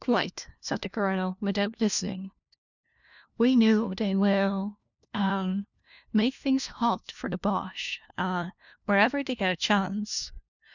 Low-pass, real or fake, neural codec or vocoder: 7.2 kHz; fake; codec, 16 kHz, 1 kbps, FunCodec, trained on LibriTTS, 50 frames a second